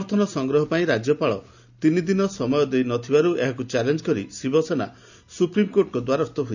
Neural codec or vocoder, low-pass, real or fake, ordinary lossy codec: none; 7.2 kHz; real; none